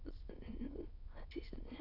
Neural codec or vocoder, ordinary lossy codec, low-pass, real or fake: autoencoder, 22.05 kHz, a latent of 192 numbers a frame, VITS, trained on many speakers; none; 5.4 kHz; fake